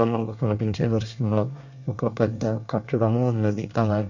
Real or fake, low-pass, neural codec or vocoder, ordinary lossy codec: fake; 7.2 kHz; codec, 24 kHz, 1 kbps, SNAC; none